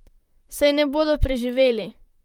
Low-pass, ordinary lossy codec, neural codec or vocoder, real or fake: 19.8 kHz; Opus, 32 kbps; vocoder, 44.1 kHz, 128 mel bands, Pupu-Vocoder; fake